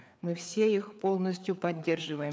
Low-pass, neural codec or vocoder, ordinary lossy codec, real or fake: none; codec, 16 kHz, 4 kbps, FreqCodec, larger model; none; fake